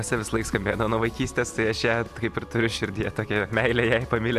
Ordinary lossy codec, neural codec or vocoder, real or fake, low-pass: AAC, 96 kbps; none; real; 14.4 kHz